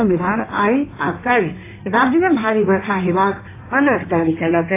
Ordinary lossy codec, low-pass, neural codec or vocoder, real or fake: AAC, 24 kbps; 3.6 kHz; codec, 16 kHz in and 24 kHz out, 1.1 kbps, FireRedTTS-2 codec; fake